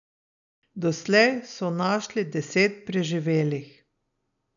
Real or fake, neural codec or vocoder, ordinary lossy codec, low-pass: real; none; none; 7.2 kHz